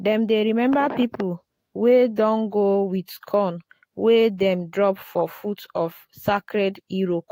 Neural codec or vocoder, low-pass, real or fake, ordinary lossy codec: autoencoder, 48 kHz, 128 numbers a frame, DAC-VAE, trained on Japanese speech; 19.8 kHz; fake; AAC, 48 kbps